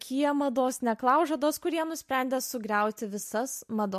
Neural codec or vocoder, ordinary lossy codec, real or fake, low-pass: none; MP3, 64 kbps; real; 14.4 kHz